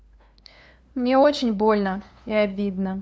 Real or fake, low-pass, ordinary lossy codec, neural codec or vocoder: fake; none; none; codec, 16 kHz, 2 kbps, FunCodec, trained on LibriTTS, 25 frames a second